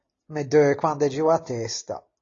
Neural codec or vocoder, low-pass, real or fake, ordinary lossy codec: none; 7.2 kHz; real; AAC, 32 kbps